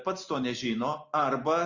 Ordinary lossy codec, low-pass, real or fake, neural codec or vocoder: Opus, 64 kbps; 7.2 kHz; real; none